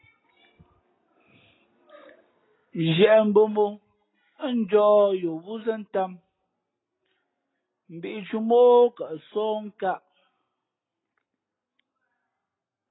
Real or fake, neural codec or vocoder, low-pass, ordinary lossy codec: real; none; 7.2 kHz; AAC, 16 kbps